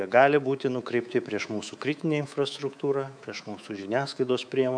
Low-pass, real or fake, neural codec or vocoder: 9.9 kHz; fake; codec, 24 kHz, 3.1 kbps, DualCodec